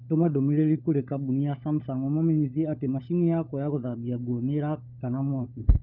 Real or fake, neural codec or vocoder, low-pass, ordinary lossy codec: fake; codec, 16 kHz, 16 kbps, FunCodec, trained on LibriTTS, 50 frames a second; 5.4 kHz; none